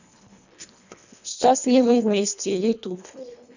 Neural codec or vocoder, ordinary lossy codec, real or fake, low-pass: codec, 24 kHz, 1.5 kbps, HILCodec; none; fake; 7.2 kHz